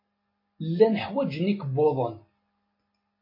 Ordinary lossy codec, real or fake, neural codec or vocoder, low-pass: MP3, 24 kbps; real; none; 5.4 kHz